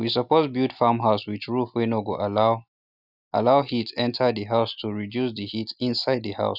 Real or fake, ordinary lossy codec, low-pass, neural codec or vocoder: real; none; 5.4 kHz; none